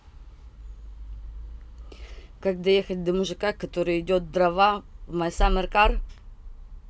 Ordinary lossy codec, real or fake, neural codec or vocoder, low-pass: none; real; none; none